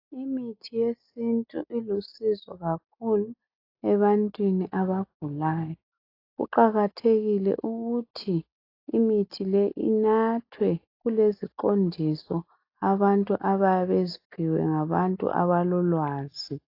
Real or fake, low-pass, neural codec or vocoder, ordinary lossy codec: real; 5.4 kHz; none; AAC, 32 kbps